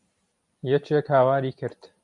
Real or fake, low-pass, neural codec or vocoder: real; 10.8 kHz; none